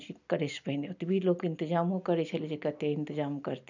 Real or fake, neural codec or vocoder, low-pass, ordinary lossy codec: real; none; 7.2 kHz; none